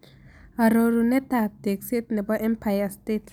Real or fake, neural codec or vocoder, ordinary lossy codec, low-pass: real; none; none; none